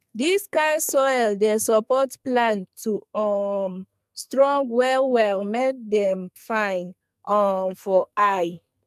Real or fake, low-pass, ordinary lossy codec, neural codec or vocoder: fake; 14.4 kHz; MP3, 96 kbps; codec, 44.1 kHz, 2.6 kbps, SNAC